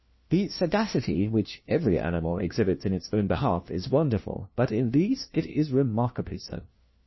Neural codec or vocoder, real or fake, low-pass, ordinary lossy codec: codec, 16 kHz, 1 kbps, FunCodec, trained on LibriTTS, 50 frames a second; fake; 7.2 kHz; MP3, 24 kbps